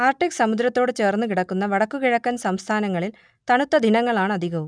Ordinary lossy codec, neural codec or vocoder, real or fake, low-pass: none; none; real; 9.9 kHz